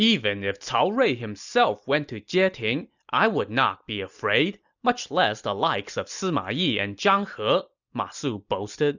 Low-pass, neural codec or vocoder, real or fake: 7.2 kHz; none; real